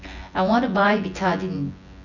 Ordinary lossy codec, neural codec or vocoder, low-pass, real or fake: none; vocoder, 24 kHz, 100 mel bands, Vocos; 7.2 kHz; fake